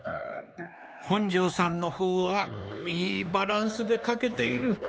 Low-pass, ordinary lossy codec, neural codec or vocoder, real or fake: none; none; codec, 16 kHz, 2 kbps, X-Codec, HuBERT features, trained on LibriSpeech; fake